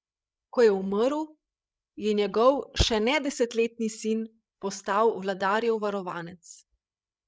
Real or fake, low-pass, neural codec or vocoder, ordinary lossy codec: fake; none; codec, 16 kHz, 16 kbps, FreqCodec, larger model; none